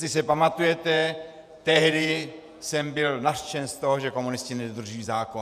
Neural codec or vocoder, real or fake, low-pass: vocoder, 48 kHz, 128 mel bands, Vocos; fake; 14.4 kHz